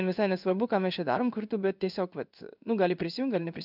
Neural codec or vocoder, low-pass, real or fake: codec, 16 kHz in and 24 kHz out, 1 kbps, XY-Tokenizer; 5.4 kHz; fake